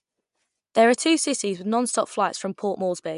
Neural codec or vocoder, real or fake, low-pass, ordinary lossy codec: vocoder, 24 kHz, 100 mel bands, Vocos; fake; 10.8 kHz; MP3, 96 kbps